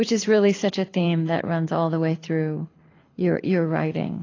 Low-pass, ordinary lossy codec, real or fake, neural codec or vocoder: 7.2 kHz; AAC, 32 kbps; fake; codec, 24 kHz, 6 kbps, HILCodec